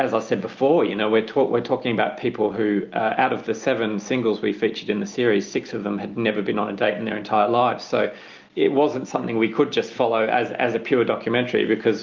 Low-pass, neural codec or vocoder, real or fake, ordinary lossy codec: 7.2 kHz; none; real; Opus, 24 kbps